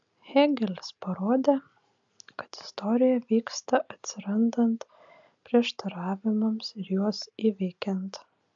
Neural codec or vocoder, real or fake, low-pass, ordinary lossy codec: none; real; 7.2 kHz; AAC, 64 kbps